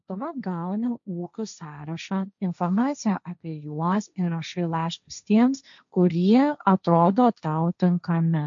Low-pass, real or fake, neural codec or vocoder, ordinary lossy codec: 7.2 kHz; fake; codec, 16 kHz, 1.1 kbps, Voila-Tokenizer; MP3, 64 kbps